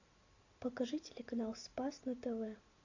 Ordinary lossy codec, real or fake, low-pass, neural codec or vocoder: AAC, 48 kbps; real; 7.2 kHz; none